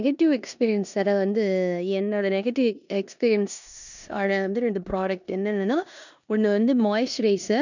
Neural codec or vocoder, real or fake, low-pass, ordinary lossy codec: codec, 16 kHz in and 24 kHz out, 0.9 kbps, LongCat-Audio-Codec, four codebook decoder; fake; 7.2 kHz; none